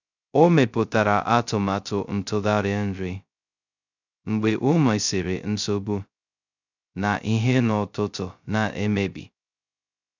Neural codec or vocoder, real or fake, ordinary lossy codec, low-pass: codec, 16 kHz, 0.2 kbps, FocalCodec; fake; none; 7.2 kHz